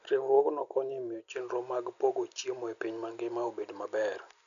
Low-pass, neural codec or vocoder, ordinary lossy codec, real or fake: 7.2 kHz; none; none; real